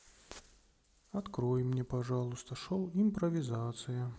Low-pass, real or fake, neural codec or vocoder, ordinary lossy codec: none; real; none; none